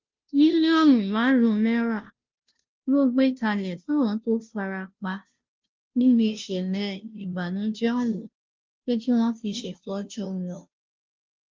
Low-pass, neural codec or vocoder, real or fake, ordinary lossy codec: 7.2 kHz; codec, 16 kHz, 0.5 kbps, FunCodec, trained on Chinese and English, 25 frames a second; fake; Opus, 24 kbps